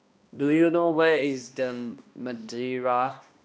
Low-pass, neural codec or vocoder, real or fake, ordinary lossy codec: none; codec, 16 kHz, 1 kbps, X-Codec, HuBERT features, trained on balanced general audio; fake; none